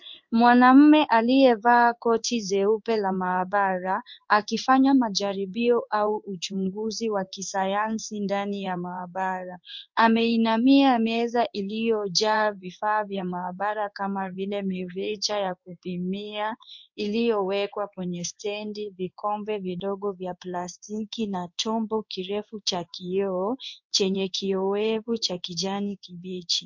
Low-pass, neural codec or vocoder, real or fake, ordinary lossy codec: 7.2 kHz; codec, 16 kHz in and 24 kHz out, 1 kbps, XY-Tokenizer; fake; MP3, 48 kbps